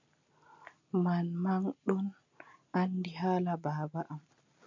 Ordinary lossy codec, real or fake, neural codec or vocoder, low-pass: MP3, 48 kbps; real; none; 7.2 kHz